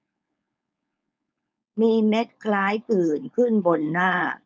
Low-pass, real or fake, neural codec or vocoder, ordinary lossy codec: none; fake; codec, 16 kHz, 4.8 kbps, FACodec; none